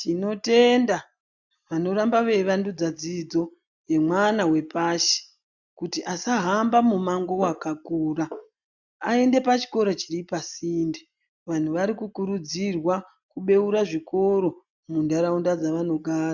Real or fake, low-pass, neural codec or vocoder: real; 7.2 kHz; none